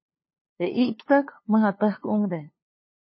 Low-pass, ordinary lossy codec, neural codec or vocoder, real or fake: 7.2 kHz; MP3, 24 kbps; codec, 16 kHz, 2 kbps, FunCodec, trained on LibriTTS, 25 frames a second; fake